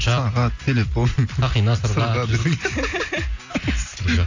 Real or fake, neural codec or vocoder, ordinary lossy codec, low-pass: real; none; AAC, 48 kbps; 7.2 kHz